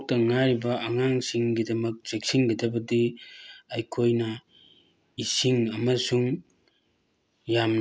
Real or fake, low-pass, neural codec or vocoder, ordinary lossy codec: real; none; none; none